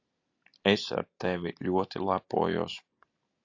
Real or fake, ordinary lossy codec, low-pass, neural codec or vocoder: real; AAC, 48 kbps; 7.2 kHz; none